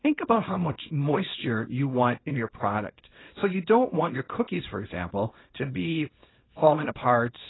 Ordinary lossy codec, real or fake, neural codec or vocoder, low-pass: AAC, 16 kbps; fake; codec, 24 kHz, 0.9 kbps, WavTokenizer, small release; 7.2 kHz